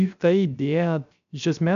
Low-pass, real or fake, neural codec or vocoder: 7.2 kHz; fake; codec, 16 kHz, 0.3 kbps, FocalCodec